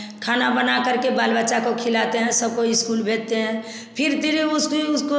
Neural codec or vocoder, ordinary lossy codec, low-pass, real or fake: none; none; none; real